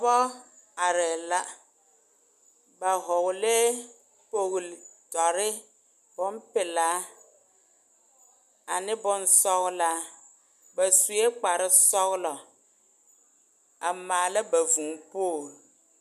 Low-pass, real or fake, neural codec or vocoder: 14.4 kHz; real; none